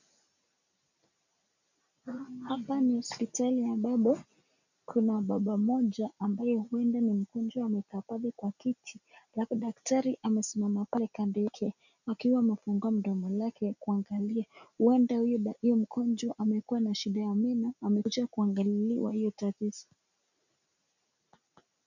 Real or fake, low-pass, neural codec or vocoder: real; 7.2 kHz; none